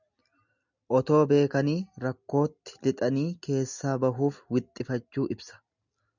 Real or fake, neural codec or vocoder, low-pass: real; none; 7.2 kHz